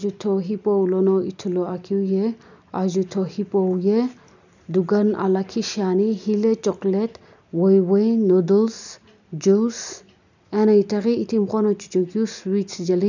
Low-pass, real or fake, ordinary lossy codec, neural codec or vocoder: 7.2 kHz; real; none; none